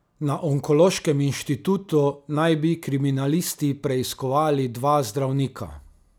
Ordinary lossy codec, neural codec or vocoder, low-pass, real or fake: none; none; none; real